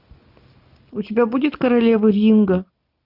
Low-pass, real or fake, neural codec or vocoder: 5.4 kHz; real; none